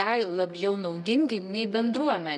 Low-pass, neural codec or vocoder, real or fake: 10.8 kHz; codec, 24 kHz, 0.9 kbps, WavTokenizer, medium music audio release; fake